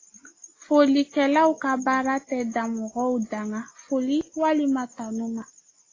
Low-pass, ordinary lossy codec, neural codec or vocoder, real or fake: 7.2 kHz; AAC, 32 kbps; none; real